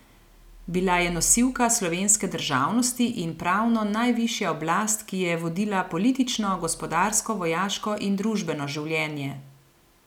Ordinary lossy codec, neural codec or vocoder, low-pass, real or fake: none; none; 19.8 kHz; real